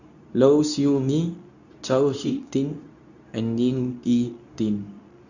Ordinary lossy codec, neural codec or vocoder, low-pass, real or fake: none; codec, 24 kHz, 0.9 kbps, WavTokenizer, medium speech release version 2; 7.2 kHz; fake